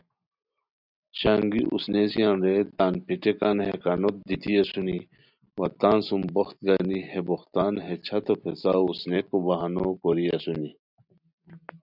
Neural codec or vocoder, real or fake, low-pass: none; real; 5.4 kHz